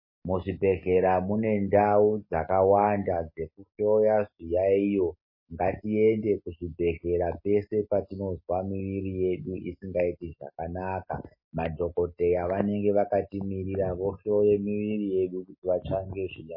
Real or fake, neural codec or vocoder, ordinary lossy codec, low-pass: real; none; MP3, 24 kbps; 5.4 kHz